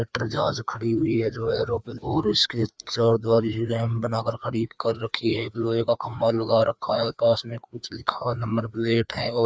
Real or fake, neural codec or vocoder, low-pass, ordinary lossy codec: fake; codec, 16 kHz, 2 kbps, FreqCodec, larger model; none; none